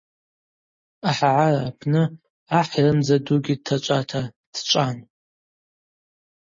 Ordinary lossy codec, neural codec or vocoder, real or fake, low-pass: MP3, 32 kbps; none; real; 7.2 kHz